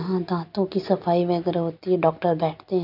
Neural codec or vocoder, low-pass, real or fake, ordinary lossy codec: none; 5.4 kHz; real; none